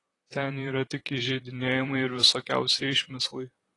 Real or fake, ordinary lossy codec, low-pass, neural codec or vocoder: fake; AAC, 32 kbps; 10.8 kHz; vocoder, 44.1 kHz, 128 mel bands, Pupu-Vocoder